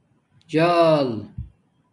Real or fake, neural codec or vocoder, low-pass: real; none; 10.8 kHz